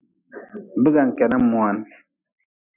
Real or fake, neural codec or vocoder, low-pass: real; none; 3.6 kHz